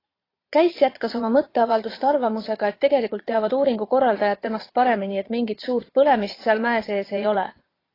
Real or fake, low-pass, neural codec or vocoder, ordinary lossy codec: fake; 5.4 kHz; vocoder, 22.05 kHz, 80 mel bands, Vocos; AAC, 24 kbps